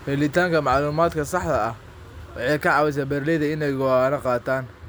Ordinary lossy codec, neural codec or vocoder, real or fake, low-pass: none; none; real; none